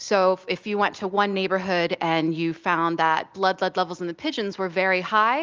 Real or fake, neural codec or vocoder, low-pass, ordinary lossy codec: real; none; 7.2 kHz; Opus, 32 kbps